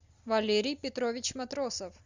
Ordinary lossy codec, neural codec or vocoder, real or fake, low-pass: Opus, 64 kbps; none; real; 7.2 kHz